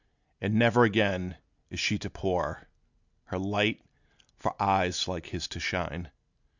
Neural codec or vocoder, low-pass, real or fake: none; 7.2 kHz; real